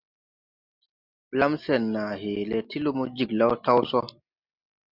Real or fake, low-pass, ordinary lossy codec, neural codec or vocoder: real; 5.4 kHz; Opus, 64 kbps; none